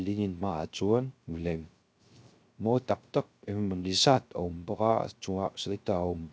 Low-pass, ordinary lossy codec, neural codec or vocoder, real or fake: none; none; codec, 16 kHz, 0.3 kbps, FocalCodec; fake